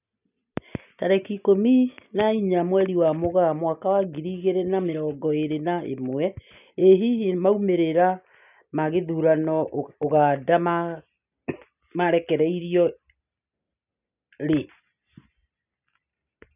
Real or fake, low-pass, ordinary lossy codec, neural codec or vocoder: real; 3.6 kHz; none; none